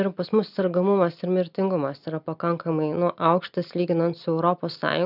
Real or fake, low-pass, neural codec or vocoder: real; 5.4 kHz; none